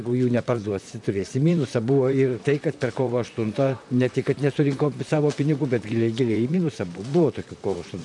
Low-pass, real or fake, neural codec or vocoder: 10.8 kHz; fake; vocoder, 44.1 kHz, 128 mel bands, Pupu-Vocoder